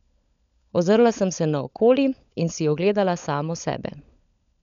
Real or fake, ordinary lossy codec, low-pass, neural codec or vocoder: fake; none; 7.2 kHz; codec, 16 kHz, 16 kbps, FunCodec, trained on LibriTTS, 50 frames a second